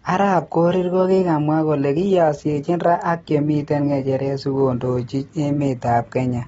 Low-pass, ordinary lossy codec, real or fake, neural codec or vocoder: 19.8 kHz; AAC, 24 kbps; real; none